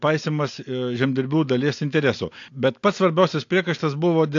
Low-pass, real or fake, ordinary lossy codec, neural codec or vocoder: 7.2 kHz; real; AAC, 48 kbps; none